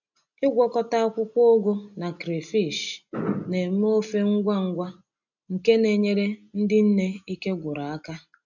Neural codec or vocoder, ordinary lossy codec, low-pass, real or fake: none; none; 7.2 kHz; real